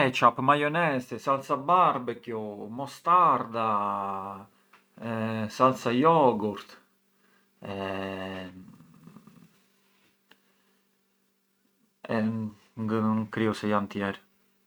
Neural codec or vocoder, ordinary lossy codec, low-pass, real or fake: none; none; none; real